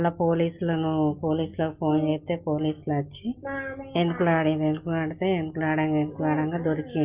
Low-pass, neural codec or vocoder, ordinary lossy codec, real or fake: 3.6 kHz; none; Opus, 24 kbps; real